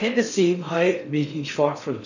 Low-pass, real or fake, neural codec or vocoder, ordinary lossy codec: 7.2 kHz; fake; codec, 16 kHz in and 24 kHz out, 0.6 kbps, FocalCodec, streaming, 4096 codes; none